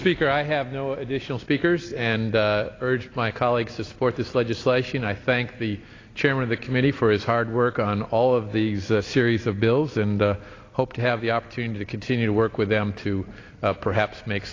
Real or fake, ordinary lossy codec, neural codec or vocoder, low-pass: real; AAC, 32 kbps; none; 7.2 kHz